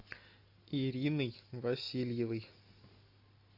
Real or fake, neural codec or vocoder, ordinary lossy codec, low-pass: real; none; AAC, 48 kbps; 5.4 kHz